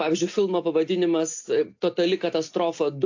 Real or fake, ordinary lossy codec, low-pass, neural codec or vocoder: real; AAC, 48 kbps; 7.2 kHz; none